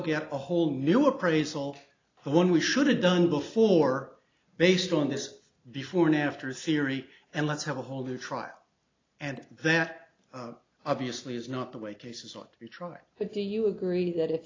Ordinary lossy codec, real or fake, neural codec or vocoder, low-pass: AAC, 32 kbps; real; none; 7.2 kHz